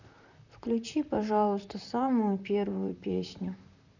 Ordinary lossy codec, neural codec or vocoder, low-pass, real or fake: none; vocoder, 44.1 kHz, 128 mel bands, Pupu-Vocoder; 7.2 kHz; fake